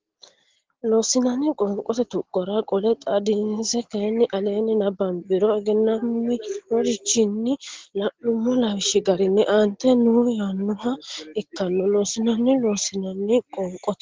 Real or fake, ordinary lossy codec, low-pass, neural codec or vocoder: real; Opus, 16 kbps; 7.2 kHz; none